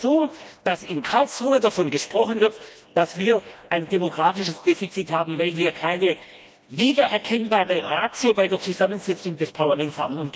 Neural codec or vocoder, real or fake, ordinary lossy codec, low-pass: codec, 16 kHz, 1 kbps, FreqCodec, smaller model; fake; none; none